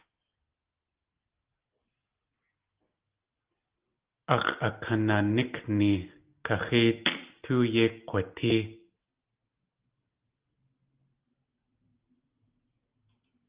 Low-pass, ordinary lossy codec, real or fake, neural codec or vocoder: 3.6 kHz; Opus, 32 kbps; real; none